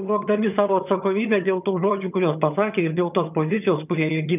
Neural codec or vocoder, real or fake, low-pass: vocoder, 22.05 kHz, 80 mel bands, HiFi-GAN; fake; 3.6 kHz